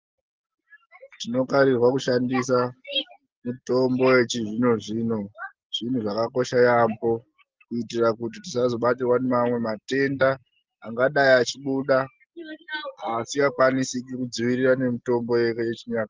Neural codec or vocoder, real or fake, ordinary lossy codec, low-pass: none; real; Opus, 32 kbps; 7.2 kHz